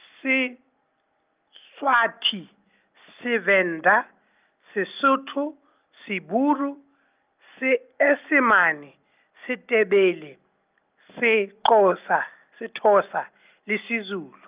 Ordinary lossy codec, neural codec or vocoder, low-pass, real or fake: Opus, 24 kbps; none; 3.6 kHz; real